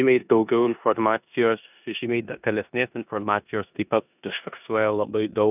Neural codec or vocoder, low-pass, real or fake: codec, 16 kHz in and 24 kHz out, 0.9 kbps, LongCat-Audio-Codec, four codebook decoder; 3.6 kHz; fake